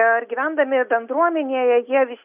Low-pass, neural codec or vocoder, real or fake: 3.6 kHz; none; real